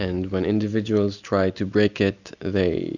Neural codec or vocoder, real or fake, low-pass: none; real; 7.2 kHz